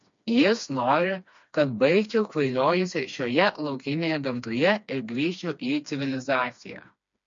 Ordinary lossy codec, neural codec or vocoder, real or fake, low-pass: MP3, 48 kbps; codec, 16 kHz, 2 kbps, FreqCodec, smaller model; fake; 7.2 kHz